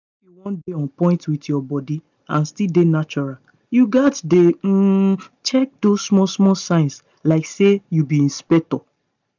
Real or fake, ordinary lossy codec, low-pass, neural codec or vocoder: real; none; 7.2 kHz; none